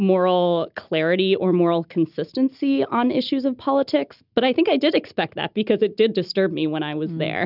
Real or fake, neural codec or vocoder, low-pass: real; none; 5.4 kHz